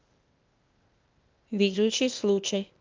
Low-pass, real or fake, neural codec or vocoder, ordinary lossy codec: 7.2 kHz; fake; codec, 16 kHz, 0.8 kbps, ZipCodec; Opus, 24 kbps